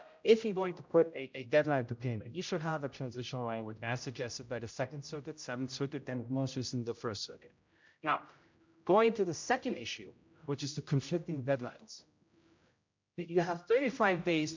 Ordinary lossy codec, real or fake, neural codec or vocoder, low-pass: MP3, 64 kbps; fake; codec, 16 kHz, 0.5 kbps, X-Codec, HuBERT features, trained on general audio; 7.2 kHz